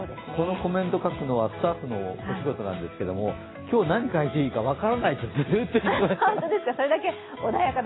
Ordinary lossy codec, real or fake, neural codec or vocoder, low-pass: AAC, 16 kbps; real; none; 7.2 kHz